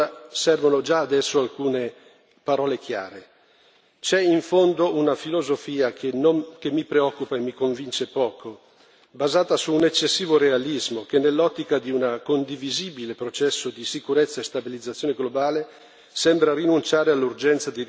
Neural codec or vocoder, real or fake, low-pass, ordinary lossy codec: none; real; none; none